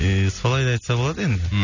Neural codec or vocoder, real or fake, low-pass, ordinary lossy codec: none; real; 7.2 kHz; none